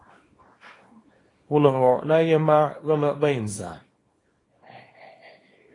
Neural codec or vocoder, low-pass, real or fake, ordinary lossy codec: codec, 24 kHz, 0.9 kbps, WavTokenizer, small release; 10.8 kHz; fake; AAC, 32 kbps